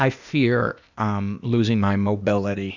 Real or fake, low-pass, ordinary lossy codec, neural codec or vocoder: fake; 7.2 kHz; Opus, 64 kbps; codec, 16 kHz, 0.8 kbps, ZipCodec